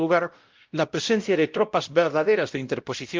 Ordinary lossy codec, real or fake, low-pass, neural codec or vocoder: Opus, 32 kbps; fake; 7.2 kHz; codec, 16 kHz, 0.5 kbps, X-Codec, WavLM features, trained on Multilingual LibriSpeech